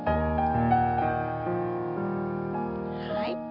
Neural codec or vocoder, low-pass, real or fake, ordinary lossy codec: none; 5.4 kHz; real; none